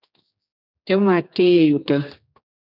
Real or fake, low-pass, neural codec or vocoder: fake; 5.4 kHz; codec, 16 kHz, 2 kbps, X-Codec, HuBERT features, trained on general audio